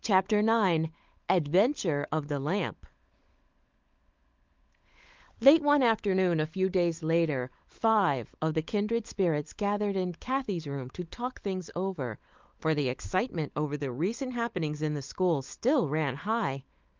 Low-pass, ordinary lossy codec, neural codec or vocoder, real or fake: 7.2 kHz; Opus, 32 kbps; codec, 16 kHz, 16 kbps, FunCodec, trained on LibriTTS, 50 frames a second; fake